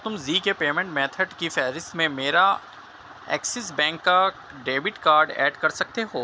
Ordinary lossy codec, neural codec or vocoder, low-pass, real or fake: none; none; none; real